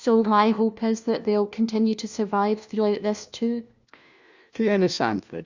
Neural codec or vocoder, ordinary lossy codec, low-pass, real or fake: codec, 16 kHz, 1 kbps, FunCodec, trained on LibriTTS, 50 frames a second; Opus, 64 kbps; 7.2 kHz; fake